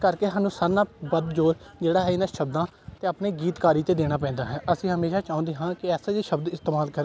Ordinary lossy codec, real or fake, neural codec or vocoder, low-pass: none; real; none; none